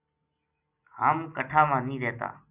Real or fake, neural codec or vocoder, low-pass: real; none; 3.6 kHz